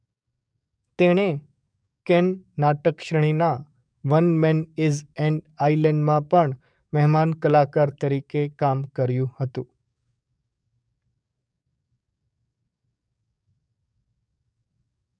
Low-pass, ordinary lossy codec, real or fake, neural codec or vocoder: 9.9 kHz; none; fake; codec, 44.1 kHz, 7.8 kbps, DAC